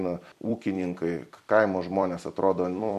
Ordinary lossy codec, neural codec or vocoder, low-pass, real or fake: MP3, 64 kbps; vocoder, 44.1 kHz, 128 mel bands every 256 samples, BigVGAN v2; 14.4 kHz; fake